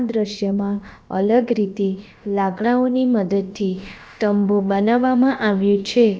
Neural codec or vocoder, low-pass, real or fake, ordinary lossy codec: codec, 16 kHz, about 1 kbps, DyCAST, with the encoder's durations; none; fake; none